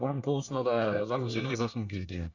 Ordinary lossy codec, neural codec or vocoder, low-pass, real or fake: none; codec, 24 kHz, 1 kbps, SNAC; 7.2 kHz; fake